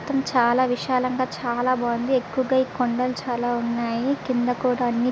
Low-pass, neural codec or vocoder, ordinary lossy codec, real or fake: none; none; none; real